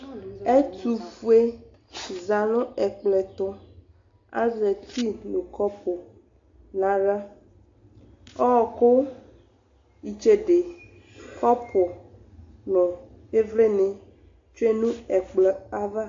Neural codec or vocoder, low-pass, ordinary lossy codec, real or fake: none; 7.2 kHz; AAC, 48 kbps; real